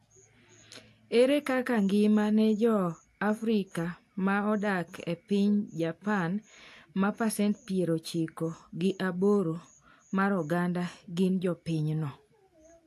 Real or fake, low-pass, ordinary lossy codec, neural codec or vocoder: real; 14.4 kHz; AAC, 64 kbps; none